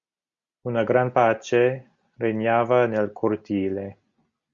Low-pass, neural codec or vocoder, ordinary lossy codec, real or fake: 7.2 kHz; none; Opus, 64 kbps; real